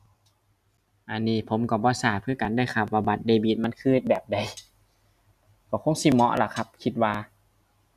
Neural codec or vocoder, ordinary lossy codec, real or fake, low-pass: none; none; real; 14.4 kHz